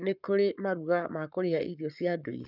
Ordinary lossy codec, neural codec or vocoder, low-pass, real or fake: none; codec, 44.1 kHz, 7.8 kbps, Pupu-Codec; 5.4 kHz; fake